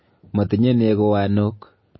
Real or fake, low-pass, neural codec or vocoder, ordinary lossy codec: real; 7.2 kHz; none; MP3, 24 kbps